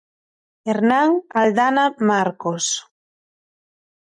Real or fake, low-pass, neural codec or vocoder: real; 10.8 kHz; none